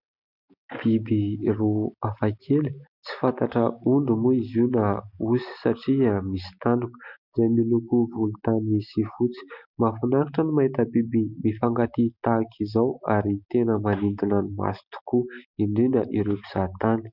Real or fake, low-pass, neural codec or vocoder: real; 5.4 kHz; none